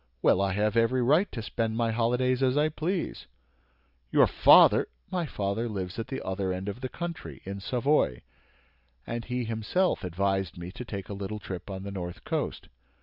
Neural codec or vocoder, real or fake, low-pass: none; real; 5.4 kHz